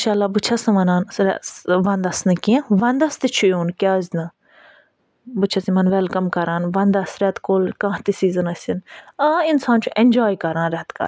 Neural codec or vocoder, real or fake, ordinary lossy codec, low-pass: none; real; none; none